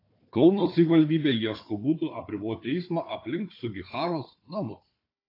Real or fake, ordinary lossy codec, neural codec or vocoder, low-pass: fake; AAC, 32 kbps; codec, 16 kHz, 4 kbps, FunCodec, trained on Chinese and English, 50 frames a second; 5.4 kHz